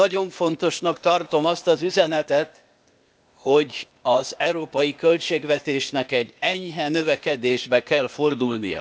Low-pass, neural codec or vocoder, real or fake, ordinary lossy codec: none; codec, 16 kHz, 0.8 kbps, ZipCodec; fake; none